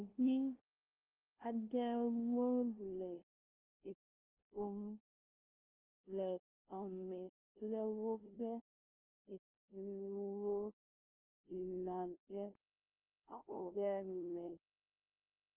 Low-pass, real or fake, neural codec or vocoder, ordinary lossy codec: 3.6 kHz; fake; codec, 16 kHz, 0.5 kbps, FunCodec, trained on LibriTTS, 25 frames a second; Opus, 16 kbps